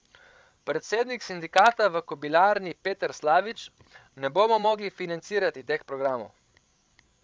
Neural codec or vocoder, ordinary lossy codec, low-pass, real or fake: codec, 16 kHz, 16 kbps, FreqCodec, larger model; none; none; fake